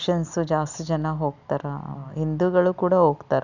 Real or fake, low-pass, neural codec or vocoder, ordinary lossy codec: real; 7.2 kHz; none; none